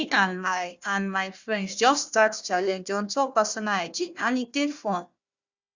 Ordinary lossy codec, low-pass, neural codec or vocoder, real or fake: Opus, 64 kbps; 7.2 kHz; codec, 16 kHz, 1 kbps, FunCodec, trained on Chinese and English, 50 frames a second; fake